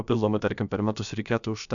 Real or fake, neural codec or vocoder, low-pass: fake; codec, 16 kHz, about 1 kbps, DyCAST, with the encoder's durations; 7.2 kHz